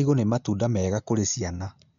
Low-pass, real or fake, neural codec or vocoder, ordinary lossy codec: 7.2 kHz; real; none; none